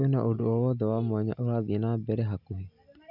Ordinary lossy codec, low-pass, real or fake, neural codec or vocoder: none; 5.4 kHz; real; none